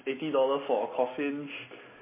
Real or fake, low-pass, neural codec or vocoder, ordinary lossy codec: real; 3.6 kHz; none; MP3, 16 kbps